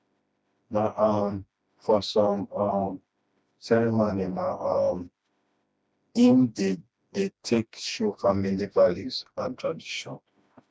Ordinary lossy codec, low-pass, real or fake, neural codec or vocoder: none; none; fake; codec, 16 kHz, 1 kbps, FreqCodec, smaller model